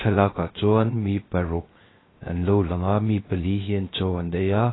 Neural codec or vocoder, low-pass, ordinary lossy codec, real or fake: codec, 16 kHz, 0.3 kbps, FocalCodec; 7.2 kHz; AAC, 16 kbps; fake